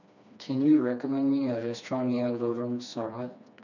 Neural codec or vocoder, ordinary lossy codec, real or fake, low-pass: codec, 16 kHz, 2 kbps, FreqCodec, smaller model; none; fake; 7.2 kHz